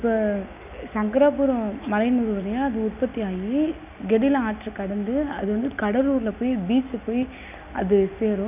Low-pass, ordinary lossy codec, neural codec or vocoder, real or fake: 3.6 kHz; none; none; real